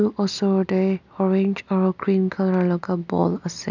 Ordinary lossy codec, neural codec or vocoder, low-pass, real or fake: none; none; 7.2 kHz; real